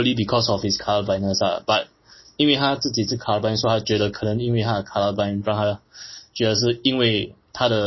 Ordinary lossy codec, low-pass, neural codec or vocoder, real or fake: MP3, 24 kbps; 7.2 kHz; none; real